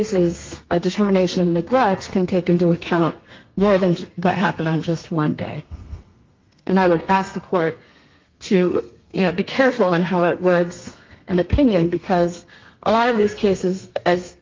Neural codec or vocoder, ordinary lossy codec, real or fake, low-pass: codec, 32 kHz, 1.9 kbps, SNAC; Opus, 24 kbps; fake; 7.2 kHz